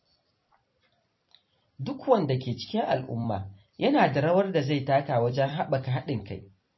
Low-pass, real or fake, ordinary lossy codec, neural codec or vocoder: 7.2 kHz; fake; MP3, 24 kbps; vocoder, 44.1 kHz, 128 mel bands every 256 samples, BigVGAN v2